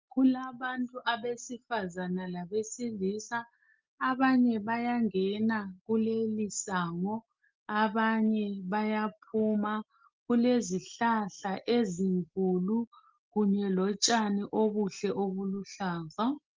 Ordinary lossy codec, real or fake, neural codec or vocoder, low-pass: Opus, 32 kbps; real; none; 7.2 kHz